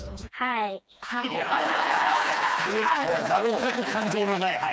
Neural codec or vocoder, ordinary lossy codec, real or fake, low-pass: codec, 16 kHz, 2 kbps, FreqCodec, smaller model; none; fake; none